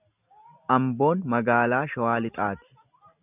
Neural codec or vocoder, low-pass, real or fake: none; 3.6 kHz; real